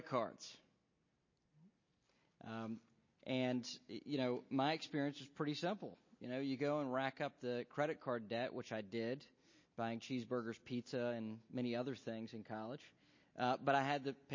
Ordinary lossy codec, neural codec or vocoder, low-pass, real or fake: MP3, 32 kbps; none; 7.2 kHz; real